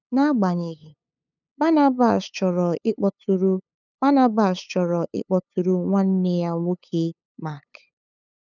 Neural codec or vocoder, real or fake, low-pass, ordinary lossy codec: codec, 16 kHz, 8 kbps, FunCodec, trained on LibriTTS, 25 frames a second; fake; 7.2 kHz; none